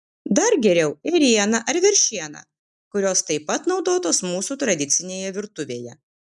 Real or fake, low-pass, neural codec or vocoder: real; 10.8 kHz; none